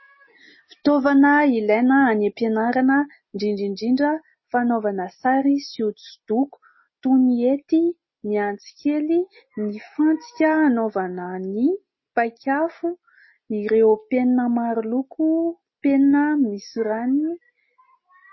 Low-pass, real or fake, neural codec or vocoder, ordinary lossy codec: 7.2 kHz; real; none; MP3, 24 kbps